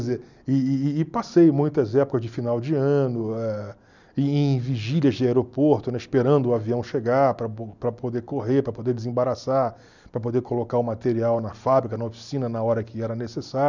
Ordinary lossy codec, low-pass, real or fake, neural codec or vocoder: none; 7.2 kHz; real; none